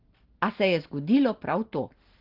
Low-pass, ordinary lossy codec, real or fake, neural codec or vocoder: 5.4 kHz; Opus, 16 kbps; real; none